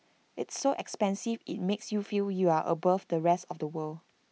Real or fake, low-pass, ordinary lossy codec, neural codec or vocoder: real; none; none; none